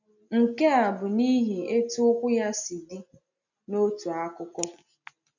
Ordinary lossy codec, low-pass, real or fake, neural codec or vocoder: none; 7.2 kHz; real; none